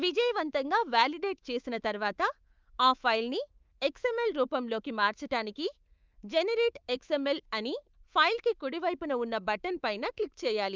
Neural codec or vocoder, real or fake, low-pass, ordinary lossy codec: codec, 24 kHz, 3.1 kbps, DualCodec; fake; 7.2 kHz; Opus, 24 kbps